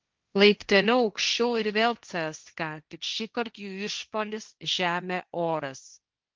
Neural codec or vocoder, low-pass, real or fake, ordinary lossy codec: codec, 16 kHz, 1.1 kbps, Voila-Tokenizer; 7.2 kHz; fake; Opus, 32 kbps